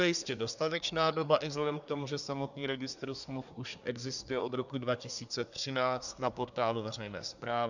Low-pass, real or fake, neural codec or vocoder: 7.2 kHz; fake; codec, 24 kHz, 1 kbps, SNAC